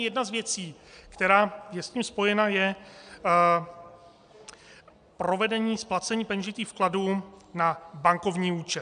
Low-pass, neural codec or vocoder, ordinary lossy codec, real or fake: 9.9 kHz; none; AAC, 96 kbps; real